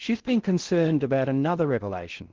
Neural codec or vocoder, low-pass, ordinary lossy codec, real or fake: codec, 16 kHz in and 24 kHz out, 0.6 kbps, FocalCodec, streaming, 4096 codes; 7.2 kHz; Opus, 16 kbps; fake